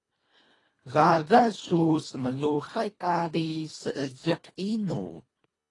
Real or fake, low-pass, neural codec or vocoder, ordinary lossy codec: fake; 10.8 kHz; codec, 24 kHz, 1.5 kbps, HILCodec; AAC, 32 kbps